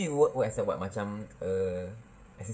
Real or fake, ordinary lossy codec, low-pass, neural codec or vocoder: fake; none; none; codec, 16 kHz, 16 kbps, FreqCodec, smaller model